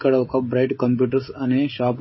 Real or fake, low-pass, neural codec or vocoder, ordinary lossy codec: fake; 7.2 kHz; codec, 16 kHz, 16 kbps, FreqCodec, smaller model; MP3, 24 kbps